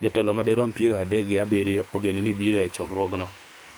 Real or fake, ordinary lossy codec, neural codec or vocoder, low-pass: fake; none; codec, 44.1 kHz, 2.6 kbps, SNAC; none